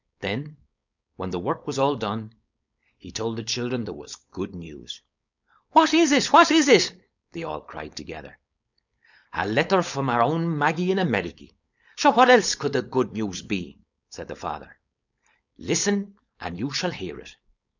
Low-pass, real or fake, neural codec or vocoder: 7.2 kHz; fake; codec, 16 kHz, 4.8 kbps, FACodec